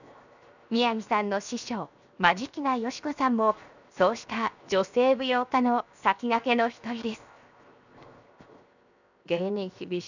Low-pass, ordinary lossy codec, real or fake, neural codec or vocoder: 7.2 kHz; none; fake; codec, 16 kHz, 0.7 kbps, FocalCodec